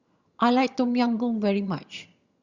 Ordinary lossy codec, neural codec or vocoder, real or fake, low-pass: Opus, 64 kbps; vocoder, 22.05 kHz, 80 mel bands, HiFi-GAN; fake; 7.2 kHz